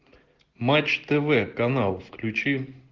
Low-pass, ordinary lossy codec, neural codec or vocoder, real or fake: 7.2 kHz; Opus, 16 kbps; none; real